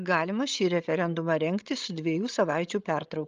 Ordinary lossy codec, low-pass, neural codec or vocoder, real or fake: Opus, 32 kbps; 7.2 kHz; codec, 16 kHz, 16 kbps, FreqCodec, larger model; fake